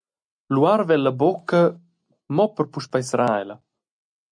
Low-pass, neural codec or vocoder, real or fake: 9.9 kHz; none; real